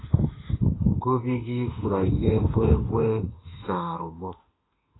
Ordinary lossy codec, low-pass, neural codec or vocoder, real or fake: AAC, 16 kbps; 7.2 kHz; autoencoder, 48 kHz, 32 numbers a frame, DAC-VAE, trained on Japanese speech; fake